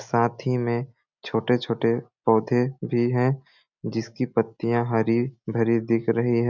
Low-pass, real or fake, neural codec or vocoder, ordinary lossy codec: 7.2 kHz; real; none; none